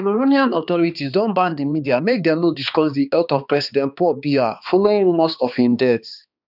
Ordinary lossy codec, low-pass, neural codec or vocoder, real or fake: none; 5.4 kHz; codec, 16 kHz, 2 kbps, X-Codec, HuBERT features, trained on balanced general audio; fake